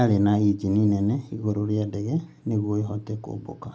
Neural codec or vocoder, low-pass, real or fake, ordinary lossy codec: none; none; real; none